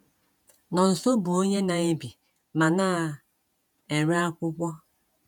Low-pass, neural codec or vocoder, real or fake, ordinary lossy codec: 19.8 kHz; vocoder, 48 kHz, 128 mel bands, Vocos; fake; none